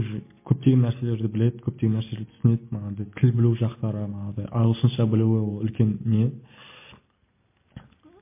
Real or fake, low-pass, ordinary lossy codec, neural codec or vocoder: real; 3.6 kHz; MP3, 24 kbps; none